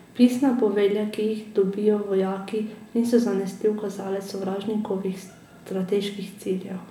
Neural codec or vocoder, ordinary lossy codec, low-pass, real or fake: none; none; 19.8 kHz; real